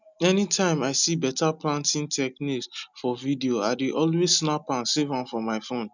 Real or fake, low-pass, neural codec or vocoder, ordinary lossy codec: real; 7.2 kHz; none; none